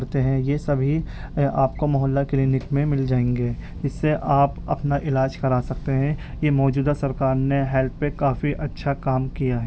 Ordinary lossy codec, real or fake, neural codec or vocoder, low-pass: none; real; none; none